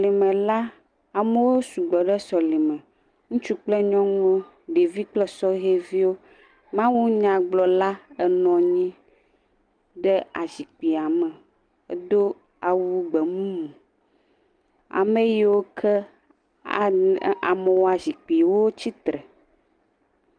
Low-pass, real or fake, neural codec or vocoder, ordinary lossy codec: 9.9 kHz; real; none; Opus, 32 kbps